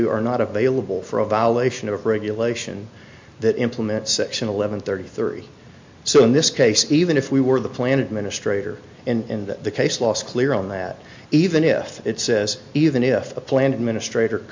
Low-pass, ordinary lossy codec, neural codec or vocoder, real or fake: 7.2 kHz; MP3, 48 kbps; none; real